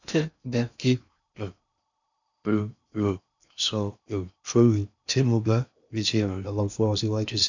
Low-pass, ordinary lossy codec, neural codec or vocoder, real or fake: 7.2 kHz; none; codec, 16 kHz in and 24 kHz out, 0.6 kbps, FocalCodec, streaming, 2048 codes; fake